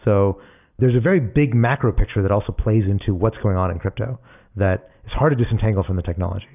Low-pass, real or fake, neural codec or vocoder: 3.6 kHz; real; none